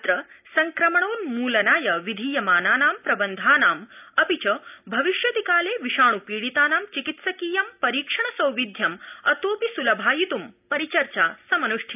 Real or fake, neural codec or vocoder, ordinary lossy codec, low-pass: real; none; none; 3.6 kHz